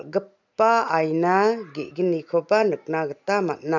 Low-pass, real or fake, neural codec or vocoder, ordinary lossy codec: 7.2 kHz; real; none; none